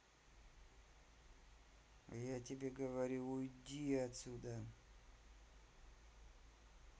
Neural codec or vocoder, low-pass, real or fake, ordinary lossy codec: none; none; real; none